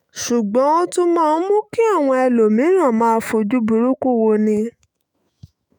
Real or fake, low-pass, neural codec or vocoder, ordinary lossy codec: fake; none; autoencoder, 48 kHz, 128 numbers a frame, DAC-VAE, trained on Japanese speech; none